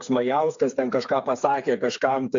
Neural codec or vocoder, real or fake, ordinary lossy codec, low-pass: codec, 16 kHz, 4 kbps, FreqCodec, smaller model; fake; AAC, 64 kbps; 7.2 kHz